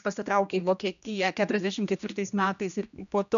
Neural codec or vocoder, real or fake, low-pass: codec, 16 kHz, 1 kbps, X-Codec, HuBERT features, trained on general audio; fake; 7.2 kHz